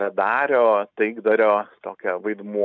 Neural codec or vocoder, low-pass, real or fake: none; 7.2 kHz; real